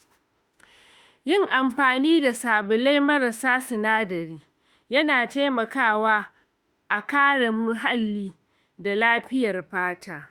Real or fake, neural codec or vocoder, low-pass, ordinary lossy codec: fake; autoencoder, 48 kHz, 32 numbers a frame, DAC-VAE, trained on Japanese speech; 19.8 kHz; Opus, 64 kbps